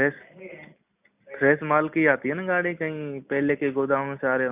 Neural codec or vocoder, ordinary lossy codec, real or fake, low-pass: none; none; real; 3.6 kHz